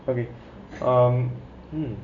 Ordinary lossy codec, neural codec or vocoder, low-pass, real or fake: none; none; 7.2 kHz; real